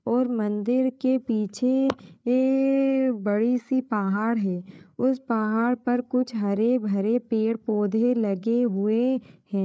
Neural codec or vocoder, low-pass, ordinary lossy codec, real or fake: codec, 16 kHz, 8 kbps, FreqCodec, larger model; none; none; fake